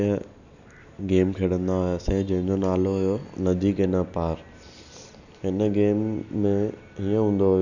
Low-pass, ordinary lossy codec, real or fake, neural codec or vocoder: 7.2 kHz; none; real; none